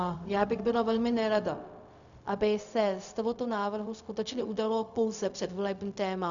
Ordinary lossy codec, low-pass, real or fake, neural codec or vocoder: MP3, 96 kbps; 7.2 kHz; fake; codec, 16 kHz, 0.4 kbps, LongCat-Audio-Codec